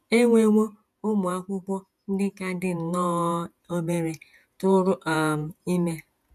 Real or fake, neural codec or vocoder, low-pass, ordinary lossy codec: fake; vocoder, 48 kHz, 128 mel bands, Vocos; 14.4 kHz; none